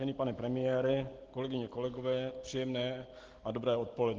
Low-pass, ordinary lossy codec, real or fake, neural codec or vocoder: 7.2 kHz; Opus, 16 kbps; real; none